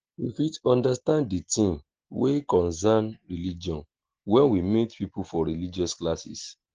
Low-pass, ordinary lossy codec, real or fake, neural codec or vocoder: 7.2 kHz; Opus, 16 kbps; real; none